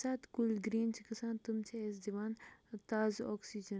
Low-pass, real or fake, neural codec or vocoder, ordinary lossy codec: none; real; none; none